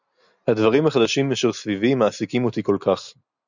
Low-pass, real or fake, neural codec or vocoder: 7.2 kHz; real; none